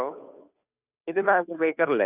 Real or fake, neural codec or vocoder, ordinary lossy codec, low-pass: fake; codec, 16 kHz, 2 kbps, FunCodec, trained on Chinese and English, 25 frames a second; none; 3.6 kHz